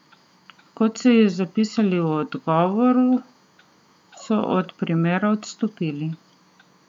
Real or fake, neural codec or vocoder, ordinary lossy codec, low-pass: fake; autoencoder, 48 kHz, 128 numbers a frame, DAC-VAE, trained on Japanese speech; none; 19.8 kHz